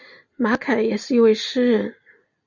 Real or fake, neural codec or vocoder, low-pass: real; none; 7.2 kHz